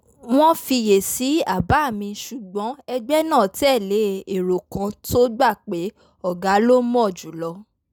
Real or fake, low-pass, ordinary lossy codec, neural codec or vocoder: real; none; none; none